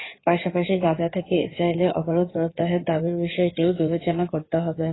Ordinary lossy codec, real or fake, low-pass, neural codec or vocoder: AAC, 16 kbps; fake; 7.2 kHz; codec, 16 kHz in and 24 kHz out, 2.2 kbps, FireRedTTS-2 codec